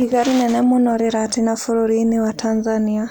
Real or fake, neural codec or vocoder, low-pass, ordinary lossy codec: real; none; none; none